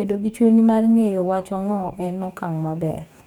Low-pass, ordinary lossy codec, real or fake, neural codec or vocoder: 19.8 kHz; none; fake; codec, 44.1 kHz, 2.6 kbps, DAC